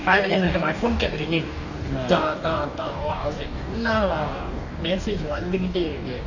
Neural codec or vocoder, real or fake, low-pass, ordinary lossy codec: codec, 44.1 kHz, 2.6 kbps, DAC; fake; 7.2 kHz; none